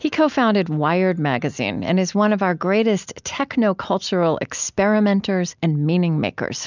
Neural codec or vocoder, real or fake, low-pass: none; real; 7.2 kHz